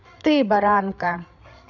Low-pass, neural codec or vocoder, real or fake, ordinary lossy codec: 7.2 kHz; codec, 16 kHz, 8 kbps, FreqCodec, larger model; fake; none